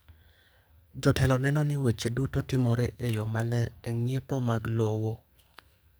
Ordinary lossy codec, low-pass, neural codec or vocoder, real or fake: none; none; codec, 44.1 kHz, 2.6 kbps, SNAC; fake